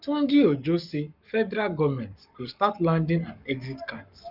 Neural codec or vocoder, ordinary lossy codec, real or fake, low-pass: codec, 44.1 kHz, 7.8 kbps, Pupu-Codec; none; fake; 5.4 kHz